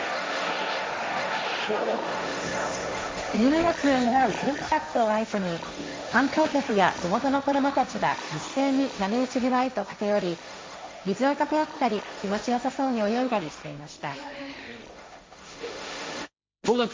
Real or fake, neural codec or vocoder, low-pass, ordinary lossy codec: fake; codec, 16 kHz, 1.1 kbps, Voila-Tokenizer; none; none